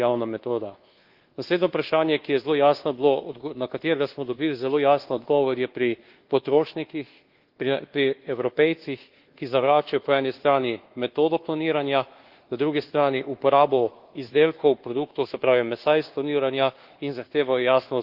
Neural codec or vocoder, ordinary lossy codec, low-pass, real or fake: codec, 24 kHz, 1.2 kbps, DualCodec; Opus, 16 kbps; 5.4 kHz; fake